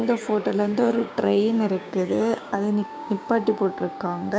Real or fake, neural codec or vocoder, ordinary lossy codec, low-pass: fake; codec, 16 kHz, 6 kbps, DAC; none; none